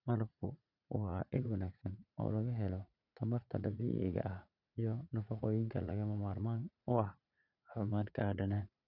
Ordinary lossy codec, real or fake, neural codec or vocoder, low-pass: none; fake; vocoder, 44.1 kHz, 80 mel bands, Vocos; 5.4 kHz